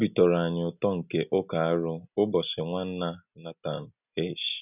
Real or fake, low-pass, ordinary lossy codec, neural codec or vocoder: real; 3.6 kHz; none; none